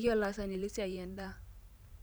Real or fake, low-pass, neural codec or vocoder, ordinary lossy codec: fake; none; vocoder, 44.1 kHz, 128 mel bands every 512 samples, BigVGAN v2; none